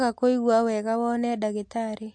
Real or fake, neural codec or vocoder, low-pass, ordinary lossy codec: real; none; 9.9 kHz; MP3, 48 kbps